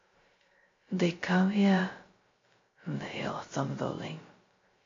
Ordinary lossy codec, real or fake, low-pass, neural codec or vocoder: AAC, 32 kbps; fake; 7.2 kHz; codec, 16 kHz, 0.2 kbps, FocalCodec